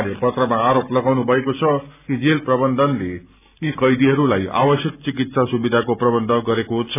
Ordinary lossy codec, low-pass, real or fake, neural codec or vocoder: none; 3.6 kHz; real; none